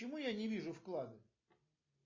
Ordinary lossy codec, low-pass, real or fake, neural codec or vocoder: MP3, 32 kbps; 7.2 kHz; real; none